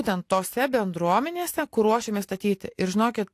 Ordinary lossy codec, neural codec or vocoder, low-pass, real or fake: AAC, 64 kbps; none; 14.4 kHz; real